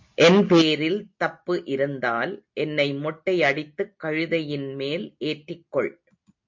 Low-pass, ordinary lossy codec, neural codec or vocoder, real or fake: 7.2 kHz; MP3, 48 kbps; none; real